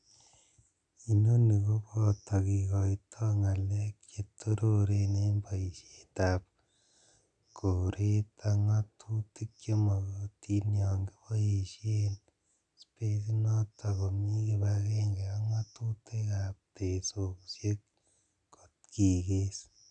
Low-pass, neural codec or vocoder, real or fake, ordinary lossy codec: 9.9 kHz; none; real; none